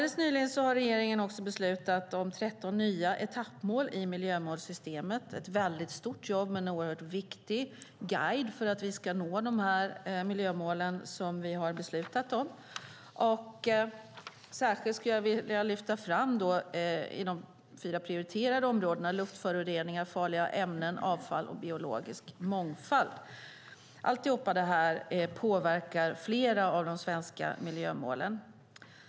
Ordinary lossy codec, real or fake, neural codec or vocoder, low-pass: none; real; none; none